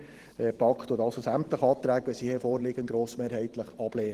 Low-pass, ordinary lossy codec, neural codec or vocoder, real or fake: 14.4 kHz; Opus, 16 kbps; vocoder, 44.1 kHz, 128 mel bands every 512 samples, BigVGAN v2; fake